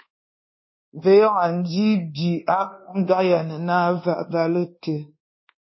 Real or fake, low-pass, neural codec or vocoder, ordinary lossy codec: fake; 7.2 kHz; codec, 24 kHz, 1.2 kbps, DualCodec; MP3, 24 kbps